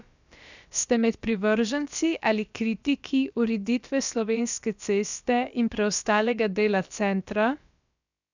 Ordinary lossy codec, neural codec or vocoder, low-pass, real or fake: none; codec, 16 kHz, about 1 kbps, DyCAST, with the encoder's durations; 7.2 kHz; fake